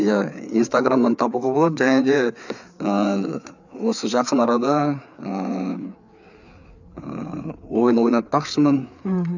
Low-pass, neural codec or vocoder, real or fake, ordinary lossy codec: 7.2 kHz; codec, 16 kHz, 4 kbps, FreqCodec, larger model; fake; none